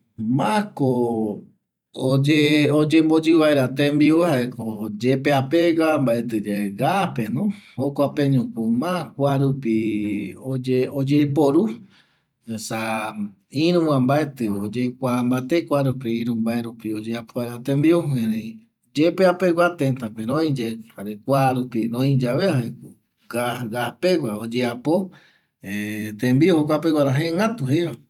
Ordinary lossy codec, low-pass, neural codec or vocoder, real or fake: none; 19.8 kHz; vocoder, 44.1 kHz, 128 mel bands every 512 samples, BigVGAN v2; fake